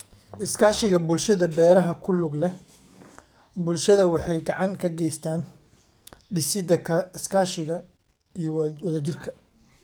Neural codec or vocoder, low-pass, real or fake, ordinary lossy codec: codec, 44.1 kHz, 2.6 kbps, SNAC; none; fake; none